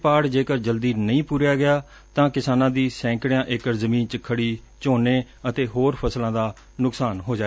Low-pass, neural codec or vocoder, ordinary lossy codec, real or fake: none; none; none; real